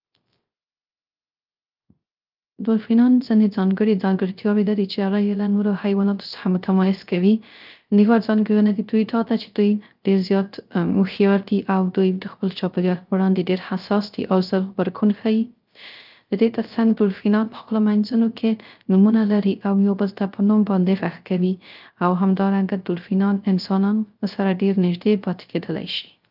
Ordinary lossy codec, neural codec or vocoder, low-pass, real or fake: Opus, 24 kbps; codec, 16 kHz, 0.3 kbps, FocalCodec; 5.4 kHz; fake